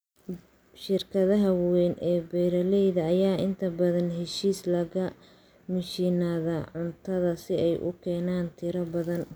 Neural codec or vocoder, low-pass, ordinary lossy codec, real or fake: none; none; none; real